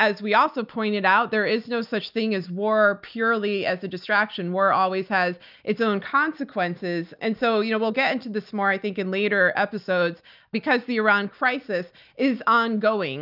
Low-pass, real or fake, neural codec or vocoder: 5.4 kHz; real; none